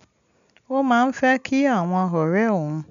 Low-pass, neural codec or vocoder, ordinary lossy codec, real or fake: 7.2 kHz; none; none; real